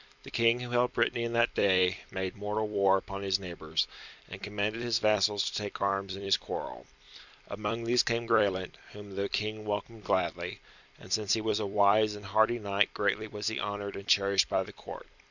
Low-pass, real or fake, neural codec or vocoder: 7.2 kHz; fake; vocoder, 44.1 kHz, 128 mel bands every 256 samples, BigVGAN v2